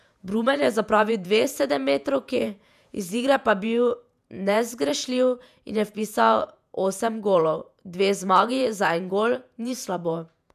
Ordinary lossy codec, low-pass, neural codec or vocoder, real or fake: none; 14.4 kHz; vocoder, 48 kHz, 128 mel bands, Vocos; fake